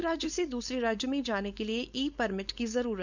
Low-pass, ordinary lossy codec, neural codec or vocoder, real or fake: 7.2 kHz; none; codec, 16 kHz, 4.8 kbps, FACodec; fake